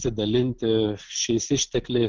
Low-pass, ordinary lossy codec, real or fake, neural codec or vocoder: 7.2 kHz; Opus, 16 kbps; real; none